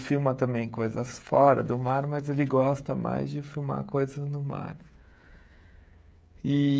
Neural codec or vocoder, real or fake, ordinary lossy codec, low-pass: codec, 16 kHz, 8 kbps, FreqCodec, smaller model; fake; none; none